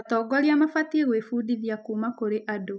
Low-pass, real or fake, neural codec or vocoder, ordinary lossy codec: none; real; none; none